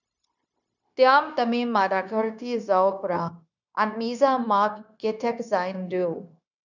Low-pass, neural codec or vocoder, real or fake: 7.2 kHz; codec, 16 kHz, 0.9 kbps, LongCat-Audio-Codec; fake